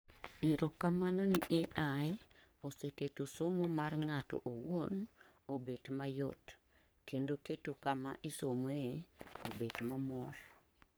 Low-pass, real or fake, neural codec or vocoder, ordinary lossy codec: none; fake; codec, 44.1 kHz, 3.4 kbps, Pupu-Codec; none